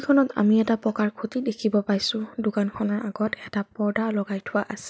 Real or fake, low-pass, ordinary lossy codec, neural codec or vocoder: real; none; none; none